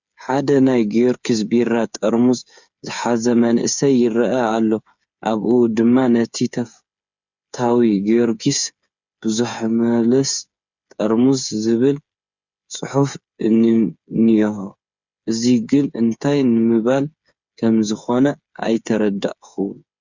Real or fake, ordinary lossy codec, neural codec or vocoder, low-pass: fake; Opus, 64 kbps; codec, 16 kHz, 8 kbps, FreqCodec, smaller model; 7.2 kHz